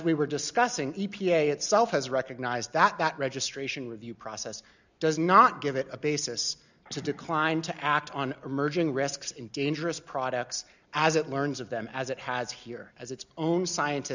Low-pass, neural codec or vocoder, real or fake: 7.2 kHz; none; real